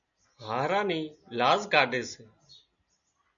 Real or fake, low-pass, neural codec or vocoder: real; 7.2 kHz; none